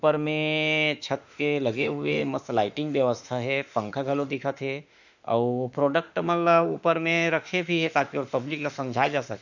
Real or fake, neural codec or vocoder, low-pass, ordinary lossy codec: fake; autoencoder, 48 kHz, 32 numbers a frame, DAC-VAE, trained on Japanese speech; 7.2 kHz; none